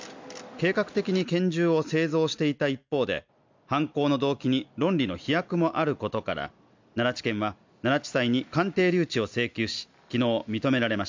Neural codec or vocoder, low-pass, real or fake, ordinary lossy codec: none; 7.2 kHz; real; MP3, 64 kbps